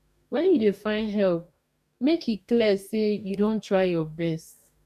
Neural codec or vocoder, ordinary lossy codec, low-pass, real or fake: codec, 44.1 kHz, 2.6 kbps, DAC; none; 14.4 kHz; fake